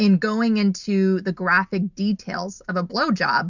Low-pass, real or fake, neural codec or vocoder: 7.2 kHz; real; none